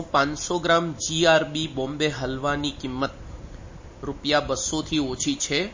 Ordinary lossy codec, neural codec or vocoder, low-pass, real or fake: MP3, 32 kbps; none; 7.2 kHz; real